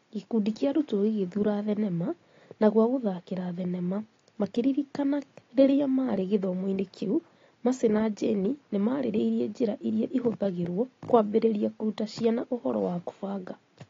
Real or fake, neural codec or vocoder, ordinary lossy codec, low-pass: real; none; AAC, 32 kbps; 7.2 kHz